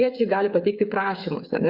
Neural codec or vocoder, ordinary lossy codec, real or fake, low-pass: codec, 16 kHz, 8 kbps, FreqCodec, smaller model; Opus, 64 kbps; fake; 5.4 kHz